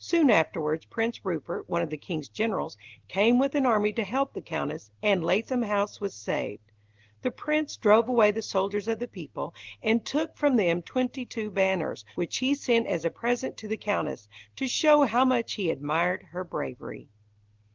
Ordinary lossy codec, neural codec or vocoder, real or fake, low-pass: Opus, 16 kbps; none; real; 7.2 kHz